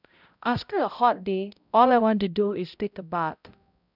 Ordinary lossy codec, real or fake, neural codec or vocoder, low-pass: none; fake; codec, 16 kHz, 0.5 kbps, X-Codec, HuBERT features, trained on balanced general audio; 5.4 kHz